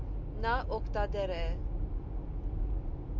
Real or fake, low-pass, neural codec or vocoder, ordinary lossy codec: real; 7.2 kHz; none; MP3, 64 kbps